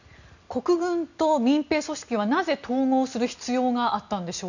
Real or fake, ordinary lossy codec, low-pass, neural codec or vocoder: real; none; 7.2 kHz; none